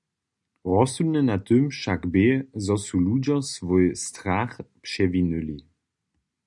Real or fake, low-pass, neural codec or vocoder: real; 10.8 kHz; none